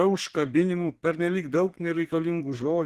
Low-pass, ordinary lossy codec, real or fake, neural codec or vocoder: 14.4 kHz; Opus, 16 kbps; fake; codec, 32 kHz, 1.9 kbps, SNAC